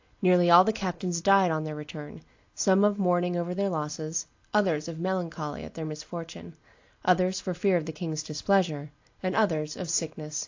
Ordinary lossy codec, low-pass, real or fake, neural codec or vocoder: AAC, 48 kbps; 7.2 kHz; real; none